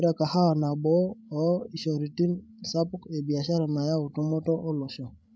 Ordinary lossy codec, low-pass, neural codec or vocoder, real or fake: none; none; codec, 16 kHz, 16 kbps, FreqCodec, larger model; fake